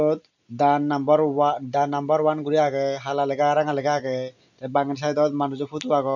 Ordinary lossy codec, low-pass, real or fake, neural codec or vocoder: none; 7.2 kHz; real; none